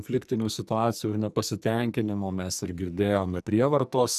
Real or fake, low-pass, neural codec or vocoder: fake; 14.4 kHz; codec, 44.1 kHz, 2.6 kbps, SNAC